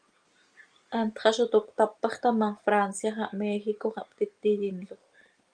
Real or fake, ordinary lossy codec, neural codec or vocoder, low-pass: real; Opus, 32 kbps; none; 9.9 kHz